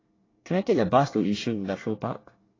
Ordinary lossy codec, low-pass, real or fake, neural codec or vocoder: AAC, 32 kbps; 7.2 kHz; fake; codec, 24 kHz, 1 kbps, SNAC